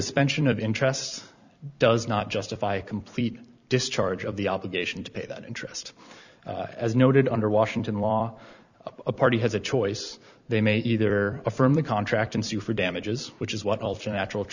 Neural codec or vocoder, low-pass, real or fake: vocoder, 44.1 kHz, 128 mel bands every 256 samples, BigVGAN v2; 7.2 kHz; fake